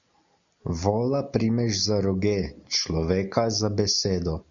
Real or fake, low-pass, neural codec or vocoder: real; 7.2 kHz; none